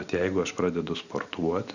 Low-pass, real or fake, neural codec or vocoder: 7.2 kHz; real; none